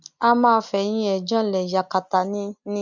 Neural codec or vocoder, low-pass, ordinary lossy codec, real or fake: none; 7.2 kHz; MP3, 64 kbps; real